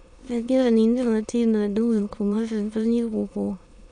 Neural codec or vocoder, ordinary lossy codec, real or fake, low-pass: autoencoder, 22.05 kHz, a latent of 192 numbers a frame, VITS, trained on many speakers; none; fake; 9.9 kHz